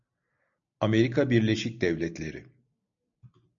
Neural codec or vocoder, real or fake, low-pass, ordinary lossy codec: none; real; 7.2 kHz; MP3, 64 kbps